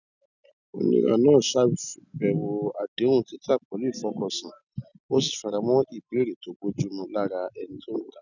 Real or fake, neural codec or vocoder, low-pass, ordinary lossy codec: real; none; 7.2 kHz; none